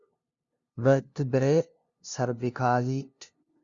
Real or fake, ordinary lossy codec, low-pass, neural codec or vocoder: fake; Opus, 64 kbps; 7.2 kHz; codec, 16 kHz, 0.5 kbps, FunCodec, trained on LibriTTS, 25 frames a second